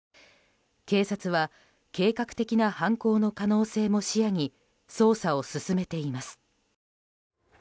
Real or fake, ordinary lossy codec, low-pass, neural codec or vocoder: real; none; none; none